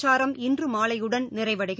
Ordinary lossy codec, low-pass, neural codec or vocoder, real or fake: none; none; none; real